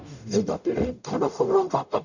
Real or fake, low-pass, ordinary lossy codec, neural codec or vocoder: fake; 7.2 kHz; none; codec, 44.1 kHz, 0.9 kbps, DAC